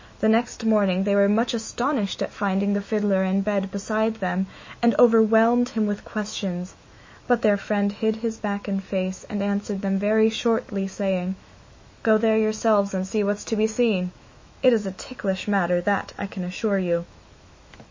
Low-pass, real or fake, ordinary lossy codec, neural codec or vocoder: 7.2 kHz; fake; MP3, 32 kbps; autoencoder, 48 kHz, 128 numbers a frame, DAC-VAE, trained on Japanese speech